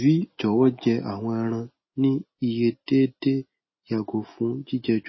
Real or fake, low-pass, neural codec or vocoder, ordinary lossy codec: real; 7.2 kHz; none; MP3, 24 kbps